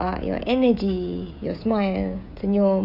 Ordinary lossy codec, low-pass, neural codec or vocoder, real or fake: none; 5.4 kHz; codec, 16 kHz, 16 kbps, FreqCodec, smaller model; fake